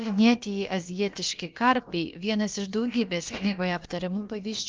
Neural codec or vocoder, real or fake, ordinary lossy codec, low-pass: codec, 16 kHz, about 1 kbps, DyCAST, with the encoder's durations; fake; Opus, 32 kbps; 7.2 kHz